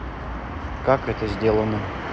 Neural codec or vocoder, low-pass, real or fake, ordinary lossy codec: none; none; real; none